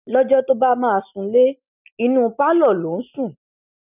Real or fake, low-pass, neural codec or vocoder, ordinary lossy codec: real; 3.6 kHz; none; none